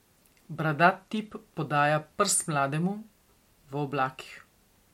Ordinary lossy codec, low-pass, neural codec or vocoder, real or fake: MP3, 64 kbps; 19.8 kHz; none; real